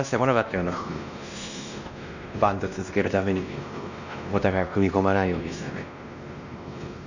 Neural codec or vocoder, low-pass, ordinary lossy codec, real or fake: codec, 16 kHz, 1 kbps, X-Codec, WavLM features, trained on Multilingual LibriSpeech; 7.2 kHz; none; fake